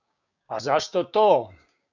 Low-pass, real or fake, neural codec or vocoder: 7.2 kHz; fake; codec, 16 kHz, 6 kbps, DAC